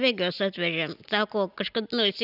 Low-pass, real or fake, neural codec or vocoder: 5.4 kHz; real; none